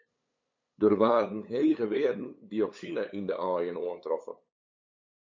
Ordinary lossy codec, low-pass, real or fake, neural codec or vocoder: MP3, 64 kbps; 7.2 kHz; fake; codec, 16 kHz, 8 kbps, FunCodec, trained on LibriTTS, 25 frames a second